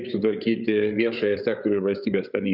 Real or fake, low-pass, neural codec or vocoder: fake; 5.4 kHz; codec, 16 kHz, 8 kbps, FreqCodec, larger model